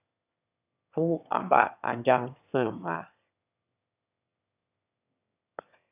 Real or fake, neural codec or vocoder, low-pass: fake; autoencoder, 22.05 kHz, a latent of 192 numbers a frame, VITS, trained on one speaker; 3.6 kHz